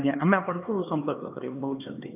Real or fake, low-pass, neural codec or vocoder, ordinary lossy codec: fake; 3.6 kHz; codec, 16 kHz, 2 kbps, X-Codec, HuBERT features, trained on LibriSpeech; none